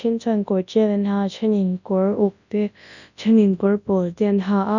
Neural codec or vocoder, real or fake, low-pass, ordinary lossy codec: codec, 24 kHz, 0.9 kbps, WavTokenizer, large speech release; fake; 7.2 kHz; none